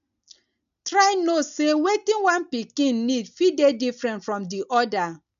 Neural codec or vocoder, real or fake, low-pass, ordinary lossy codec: none; real; 7.2 kHz; none